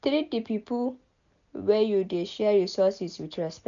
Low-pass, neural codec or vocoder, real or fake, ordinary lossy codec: 7.2 kHz; none; real; none